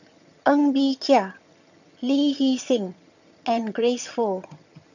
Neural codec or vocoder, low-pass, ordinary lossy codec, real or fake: vocoder, 22.05 kHz, 80 mel bands, HiFi-GAN; 7.2 kHz; none; fake